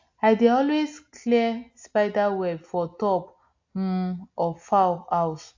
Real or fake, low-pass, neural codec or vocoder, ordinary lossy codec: real; 7.2 kHz; none; AAC, 48 kbps